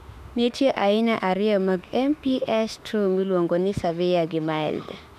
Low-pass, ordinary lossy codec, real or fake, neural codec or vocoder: 14.4 kHz; none; fake; autoencoder, 48 kHz, 32 numbers a frame, DAC-VAE, trained on Japanese speech